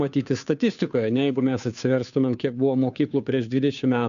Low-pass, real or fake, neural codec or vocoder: 7.2 kHz; fake; codec, 16 kHz, 2 kbps, FunCodec, trained on Chinese and English, 25 frames a second